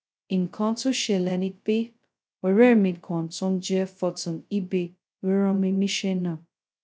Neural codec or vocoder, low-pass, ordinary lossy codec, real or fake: codec, 16 kHz, 0.2 kbps, FocalCodec; none; none; fake